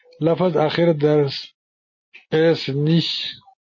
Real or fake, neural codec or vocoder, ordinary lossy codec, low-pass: real; none; MP3, 32 kbps; 7.2 kHz